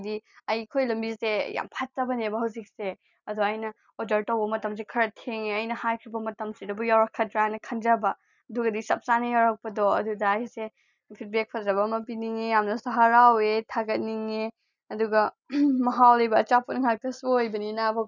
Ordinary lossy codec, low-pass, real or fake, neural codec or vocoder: none; 7.2 kHz; real; none